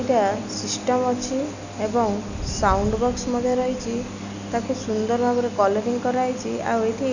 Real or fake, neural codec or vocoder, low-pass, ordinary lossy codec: real; none; 7.2 kHz; none